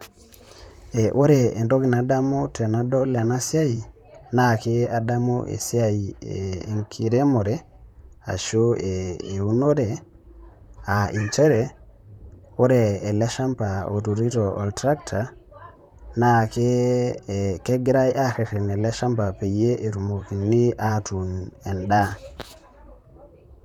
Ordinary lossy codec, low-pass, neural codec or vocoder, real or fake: none; 19.8 kHz; vocoder, 44.1 kHz, 128 mel bands, Pupu-Vocoder; fake